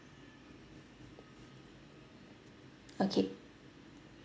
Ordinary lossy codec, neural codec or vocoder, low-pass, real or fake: none; none; none; real